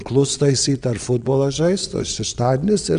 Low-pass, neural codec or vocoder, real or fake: 9.9 kHz; vocoder, 22.05 kHz, 80 mel bands, WaveNeXt; fake